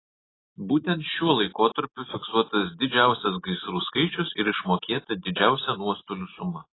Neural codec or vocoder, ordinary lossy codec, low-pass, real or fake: none; AAC, 16 kbps; 7.2 kHz; real